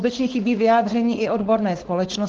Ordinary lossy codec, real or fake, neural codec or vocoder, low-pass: Opus, 16 kbps; fake; codec, 16 kHz, 4.8 kbps, FACodec; 7.2 kHz